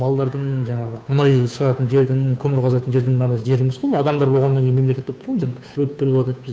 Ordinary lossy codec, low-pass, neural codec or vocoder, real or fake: none; none; codec, 16 kHz, 2 kbps, FunCodec, trained on Chinese and English, 25 frames a second; fake